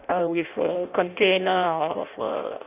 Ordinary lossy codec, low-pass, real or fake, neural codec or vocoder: none; 3.6 kHz; fake; codec, 16 kHz in and 24 kHz out, 0.6 kbps, FireRedTTS-2 codec